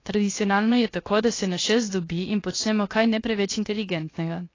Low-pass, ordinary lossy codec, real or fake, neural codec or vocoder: 7.2 kHz; AAC, 32 kbps; fake; codec, 16 kHz, 0.7 kbps, FocalCodec